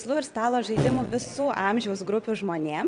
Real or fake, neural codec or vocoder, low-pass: fake; vocoder, 22.05 kHz, 80 mel bands, WaveNeXt; 9.9 kHz